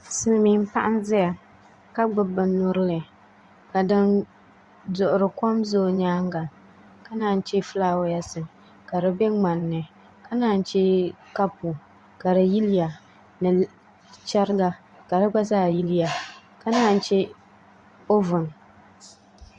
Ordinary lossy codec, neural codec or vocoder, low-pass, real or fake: Opus, 64 kbps; vocoder, 24 kHz, 100 mel bands, Vocos; 10.8 kHz; fake